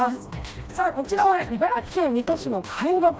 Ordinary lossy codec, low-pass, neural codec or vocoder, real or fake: none; none; codec, 16 kHz, 1 kbps, FreqCodec, smaller model; fake